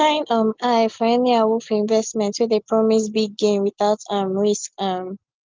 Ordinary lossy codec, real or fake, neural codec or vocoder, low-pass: Opus, 24 kbps; real; none; 7.2 kHz